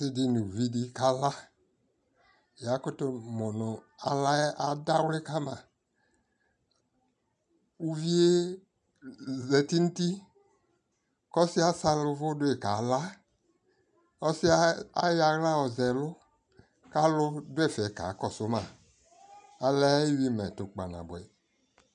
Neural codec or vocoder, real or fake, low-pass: none; real; 9.9 kHz